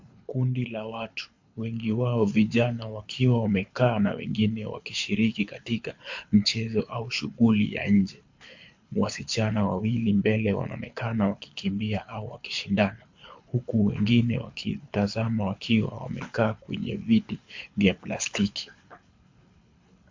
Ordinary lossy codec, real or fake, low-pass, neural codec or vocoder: MP3, 48 kbps; fake; 7.2 kHz; codec, 24 kHz, 6 kbps, HILCodec